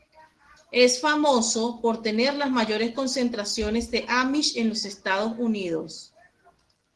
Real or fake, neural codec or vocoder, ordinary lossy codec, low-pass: real; none; Opus, 16 kbps; 10.8 kHz